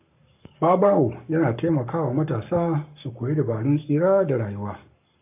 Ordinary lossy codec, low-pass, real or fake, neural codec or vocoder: AAC, 32 kbps; 3.6 kHz; fake; codec, 44.1 kHz, 7.8 kbps, Pupu-Codec